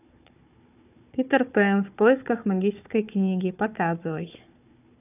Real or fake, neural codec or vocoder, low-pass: fake; codec, 16 kHz, 4 kbps, FunCodec, trained on Chinese and English, 50 frames a second; 3.6 kHz